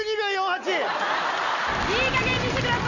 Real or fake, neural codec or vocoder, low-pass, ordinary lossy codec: real; none; 7.2 kHz; none